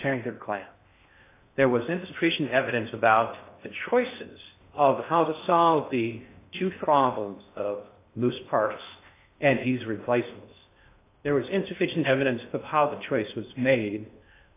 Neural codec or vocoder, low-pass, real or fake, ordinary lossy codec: codec, 16 kHz in and 24 kHz out, 0.6 kbps, FocalCodec, streaming, 4096 codes; 3.6 kHz; fake; AAC, 24 kbps